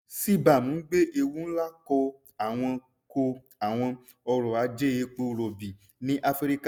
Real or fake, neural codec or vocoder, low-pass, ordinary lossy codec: real; none; none; none